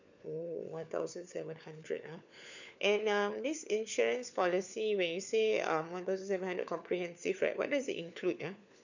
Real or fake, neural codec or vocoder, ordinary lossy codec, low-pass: fake; codec, 16 kHz, 4 kbps, FunCodec, trained on LibriTTS, 50 frames a second; none; 7.2 kHz